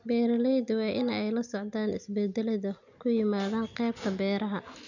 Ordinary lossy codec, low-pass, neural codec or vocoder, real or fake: none; 7.2 kHz; none; real